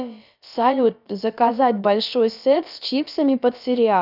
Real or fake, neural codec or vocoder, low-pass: fake; codec, 16 kHz, about 1 kbps, DyCAST, with the encoder's durations; 5.4 kHz